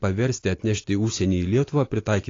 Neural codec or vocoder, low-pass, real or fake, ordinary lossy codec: none; 7.2 kHz; real; AAC, 32 kbps